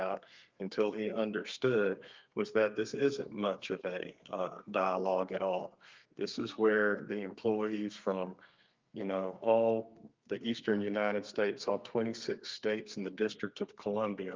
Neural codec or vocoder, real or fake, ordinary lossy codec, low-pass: codec, 32 kHz, 1.9 kbps, SNAC; fake; Opus, 24 kbps; 7.2 kHz